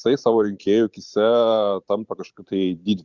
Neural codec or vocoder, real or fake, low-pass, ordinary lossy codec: vocoder, 44.1 kHz, 128 mel bands every 512 samples, BigVGAN v2; fake; 7.2 kHz; Opus, 64 kbps